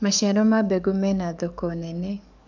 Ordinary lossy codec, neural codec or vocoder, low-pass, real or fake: none; codec, 44.1 kHz, 7.8 kbps, DAC; 7.2 kHz; fake